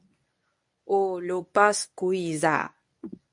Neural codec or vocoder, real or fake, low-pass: codec, 24 kHz, 0.9 kbps, WavTokenizer, medium speech release version 1; fake; 10.8 kHz